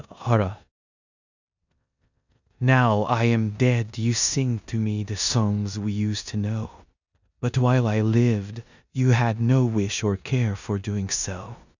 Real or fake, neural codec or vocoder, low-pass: fake; codec, 16 kHz in and 24 kHz out, 0.9 kbps, LongCat-Audio-Codec, four codebook decoder; 7.2 kHz